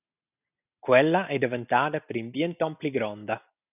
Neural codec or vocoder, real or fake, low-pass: none; real; 3.6 kHz